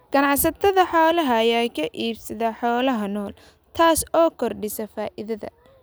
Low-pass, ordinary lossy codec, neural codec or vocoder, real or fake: none; none; none; real